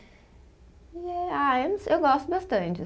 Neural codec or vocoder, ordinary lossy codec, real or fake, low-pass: none; none; real; none